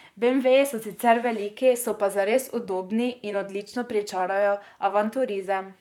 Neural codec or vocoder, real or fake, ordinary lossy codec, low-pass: vocoder, 44.1 kHz, 128 mel bands, Pupu-Vocoder; fake; none; 19.8 kHz